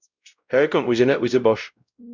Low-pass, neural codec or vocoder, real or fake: 7.2 kHz; codec, 16 kHz, 0.5 kbps, X-Codec, WavLM features, trained on Multilingual LibriSpeech; fake